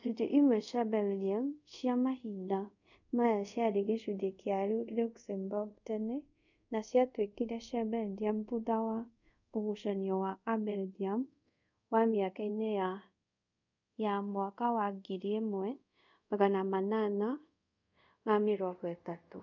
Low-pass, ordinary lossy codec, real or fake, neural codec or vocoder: 7.2 kHz; none; fake; codec, 24 kHz, 0.5 kbps, DualCodec